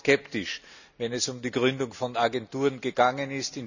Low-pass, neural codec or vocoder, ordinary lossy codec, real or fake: 7.2 kHz; none; none; real